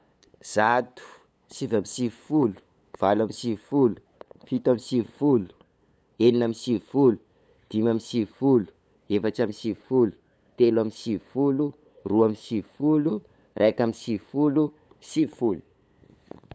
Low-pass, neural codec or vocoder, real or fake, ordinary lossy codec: none; codec, 16 kHz, 8 kbps, FunCodec, trained on LibriTTS, 25 frames a second; fake; none